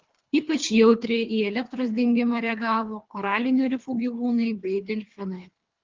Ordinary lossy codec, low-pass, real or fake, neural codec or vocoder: Opus, 32 kbps; 7.2 kHz; fake; codec, 24 kHz, 3 kbps, HILCodec